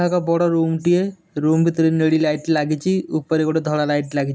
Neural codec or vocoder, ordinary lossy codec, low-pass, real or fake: none; none; none; real